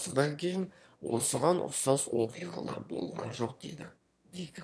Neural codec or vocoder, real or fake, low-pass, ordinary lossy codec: autoencoder, 22.05 kHz, a latent of 192 numbers a frame, VITS, trained on one speaker; fake; none; none